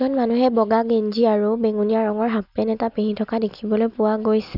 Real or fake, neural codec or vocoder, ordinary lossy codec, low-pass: real; none; none; 5.4 kHz